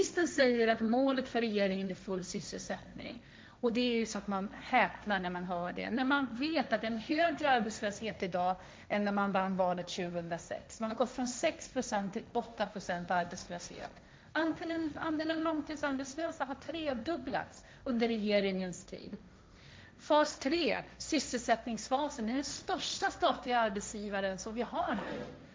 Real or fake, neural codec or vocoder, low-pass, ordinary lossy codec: fake; codec, 16 kHz, 1.1 kbps, Voila-Tokenizer; none; none